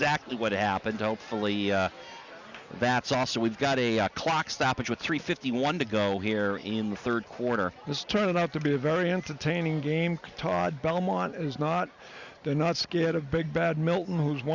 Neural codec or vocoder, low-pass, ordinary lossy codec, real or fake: none; 7.2 kHz; Opus, 64 kbps; real